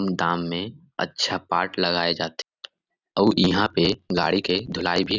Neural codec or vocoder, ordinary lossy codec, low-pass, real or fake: none; none; 7.2 kHz; real